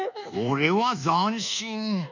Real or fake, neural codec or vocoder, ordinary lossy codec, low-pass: fake; codec, 24 kHz, 1.2 kbps, DualCodec; none; 7.2 kHz